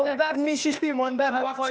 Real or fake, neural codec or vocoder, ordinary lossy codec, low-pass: fake; codec, 16 kHz, 0.8 kbps, ZipCodec; none; none